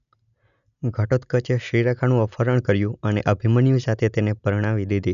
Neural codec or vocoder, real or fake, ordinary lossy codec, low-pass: none; real; none; 7.2 kHz